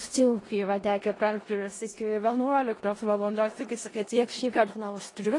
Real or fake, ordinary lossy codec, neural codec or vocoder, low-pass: fake; AAC, 32 kbps; codec, 16 kHz in and 24 kHz out, 0.4 kbps, LongCat-Audio-Codec, four codebook decoder; 10.8 kHz